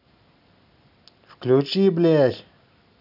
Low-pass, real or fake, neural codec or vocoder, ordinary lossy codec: 5.4 kHz; real; none; none